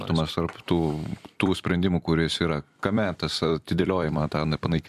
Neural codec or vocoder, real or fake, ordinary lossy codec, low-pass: vocoder, 44.1 kHz, 128 mel bands every 256 samples, BigVGAN v2; fake; AAC, 96 kbps; 14.4 kHz